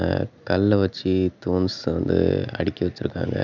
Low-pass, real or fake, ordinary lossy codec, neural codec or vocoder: 7.2 kHz; real; none; none